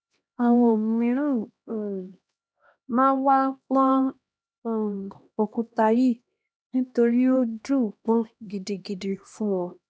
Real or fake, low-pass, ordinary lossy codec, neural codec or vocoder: fake; none; none; codec, 16 kHz, 1 kbps, X-Codec, HuBERT features, trained on LibriSpeech